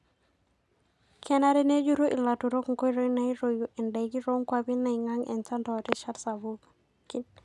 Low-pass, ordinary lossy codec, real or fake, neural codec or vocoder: none; none; real; none